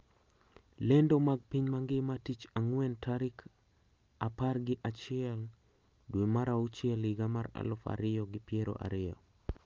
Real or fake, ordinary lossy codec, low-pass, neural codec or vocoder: real; Opus, 24 kbps; 7.2 kHz; none